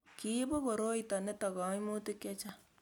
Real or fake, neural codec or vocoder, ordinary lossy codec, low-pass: real; none; none; 19.8 kHz